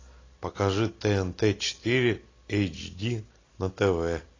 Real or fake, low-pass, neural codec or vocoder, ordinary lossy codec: real; 7.2 kHz; none; AAC, 32 kbps